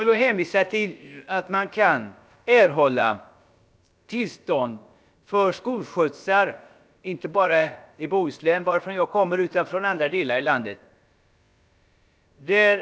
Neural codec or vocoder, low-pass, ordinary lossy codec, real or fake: codec, 16 kHz, about 1 kbps, DyCAST, with the encoder's durations; none; none; fake